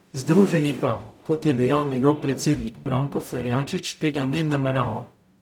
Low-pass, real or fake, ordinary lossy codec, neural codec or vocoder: 19.8 kHz; fake; none; codec, 44.1 kHz, 0.9 kbps, DAC